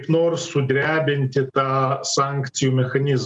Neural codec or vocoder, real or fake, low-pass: none; real; 10.8 kHz